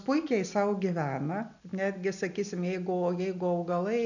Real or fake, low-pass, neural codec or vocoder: real; 7.2 kHz; none